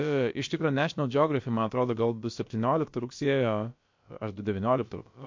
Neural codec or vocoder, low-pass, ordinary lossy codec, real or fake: codec, 16 kHz, about 1 kbps, DyCAST, with the encoder's durations; 7.2 kHz; MP3, 48 kbps; fake